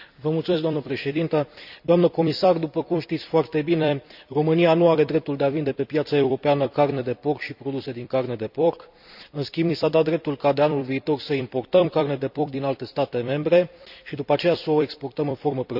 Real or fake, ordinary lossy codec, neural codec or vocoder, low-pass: fake; none; vocoder, 44.1 kHz, 128 mel bands every 256 samples, BigVGAN v2; 5.4 kHz